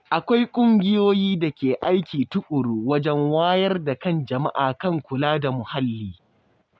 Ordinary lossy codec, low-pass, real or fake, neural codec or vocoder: none; none; real; none